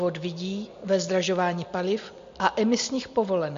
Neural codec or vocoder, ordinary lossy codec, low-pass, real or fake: none; MP3, 48 kbps; 7.2 kHz; real